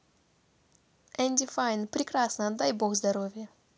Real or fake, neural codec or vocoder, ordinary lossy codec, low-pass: real; none; none; none